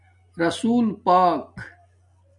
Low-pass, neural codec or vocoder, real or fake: 10.8 kHz; none; real